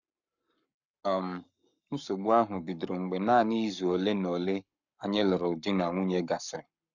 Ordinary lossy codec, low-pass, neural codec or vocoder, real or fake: none; 7.2 kHz; codec, 44.1 kHz, 7.8 kbps, Pupu-Codec; fake